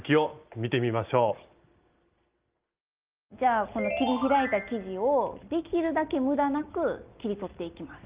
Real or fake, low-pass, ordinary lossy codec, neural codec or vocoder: real; 3.6 kHz; Opus, 32 kbps; none